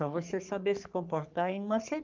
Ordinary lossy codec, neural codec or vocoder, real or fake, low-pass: Opus, 24 kbps; codec, 44.1 kHz, 3.4 kbps, Pupu-Codec; fake; 7.2 kHz